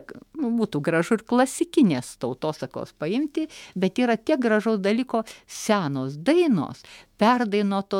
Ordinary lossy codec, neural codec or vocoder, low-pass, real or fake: MP3, 96 kbps; autoencoder, 48 kHz, 128 numbers a frame, DAC-VAE, trained on Japanese speech; 19.8 kHz; fake